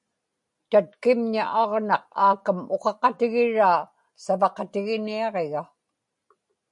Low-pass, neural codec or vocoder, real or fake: 10.8 kHz; none; real